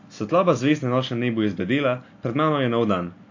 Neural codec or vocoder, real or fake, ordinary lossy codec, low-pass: none; real; AAC, 48 kbps; 7.2 kHz